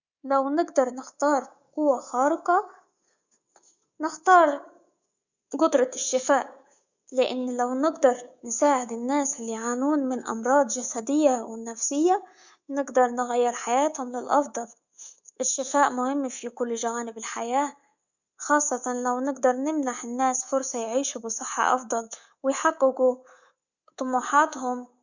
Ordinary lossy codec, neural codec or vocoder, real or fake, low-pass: Opus, 64 kbps; codec, 24 kHz, 3.1 kbps, DualCodec; fake; 7.2 kHz